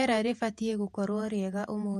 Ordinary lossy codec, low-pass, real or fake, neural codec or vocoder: MP3, 64 kbps; 14.4 kHz; fake; vocoder, 48 kHz, 128 mel bands, Vocos